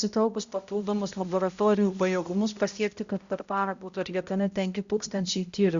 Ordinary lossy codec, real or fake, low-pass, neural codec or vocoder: Opus, 64 kbps; fake; 7.2 kHz; codec, 16 kHz, 0.5 kbps, X-Codec, HuBERT features, trained on balanced general audio